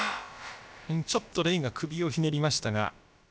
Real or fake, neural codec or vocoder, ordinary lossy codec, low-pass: fake; codec, 16 kHz, about 1 kbps, DyCAST, with the encoder's durations; none; none